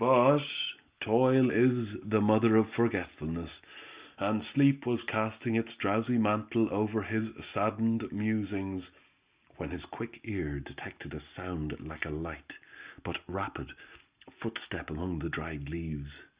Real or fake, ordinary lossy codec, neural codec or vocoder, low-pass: real; Opus, 64 kbps; none; 3.6 kHz